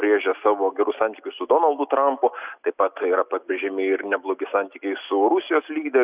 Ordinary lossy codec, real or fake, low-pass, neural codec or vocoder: Opus, 64 kbps; real; 3.6 kHz; none